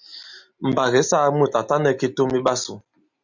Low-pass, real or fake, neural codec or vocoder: 7.2 kHz; real; none